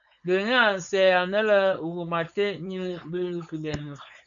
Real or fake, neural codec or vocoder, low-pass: fake; codec, 16 kHz, 4.8 kbps, FACodec; 7.2 kHz